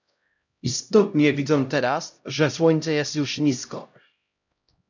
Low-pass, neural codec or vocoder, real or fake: 7.2 kHz; codec, 16 kHz, 0.5 kbps, X-Codec, HuBERT features, trained on LibriSpeech; fake